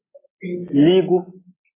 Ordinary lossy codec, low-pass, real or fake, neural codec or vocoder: AAC, 16 kbps; 3.6 kHz; real; none